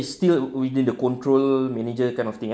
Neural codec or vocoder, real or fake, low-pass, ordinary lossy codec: none; real; none; none